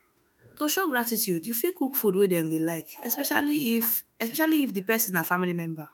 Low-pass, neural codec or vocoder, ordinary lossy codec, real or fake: none; autoencoder, 48 kHz, 32 numbers a frame, DAC-VAE, trained on Japanese speech; none; fake